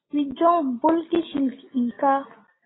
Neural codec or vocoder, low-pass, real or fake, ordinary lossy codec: none; 7.2 kHz; real; AAC, 16 kbps